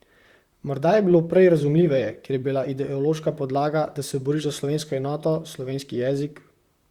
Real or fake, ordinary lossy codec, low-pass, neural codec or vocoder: fake; Opus, 64 kbps; 19.8 kHz; vocoder, 44.1 kHz, 128 mel bands, Pupu-Vocoder